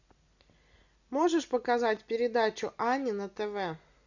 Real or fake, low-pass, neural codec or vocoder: real; 7.2 kHz; none